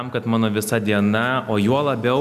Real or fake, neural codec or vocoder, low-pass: real; none; 14.4 kHz